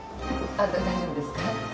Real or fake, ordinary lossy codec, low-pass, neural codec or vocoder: real; none; none; none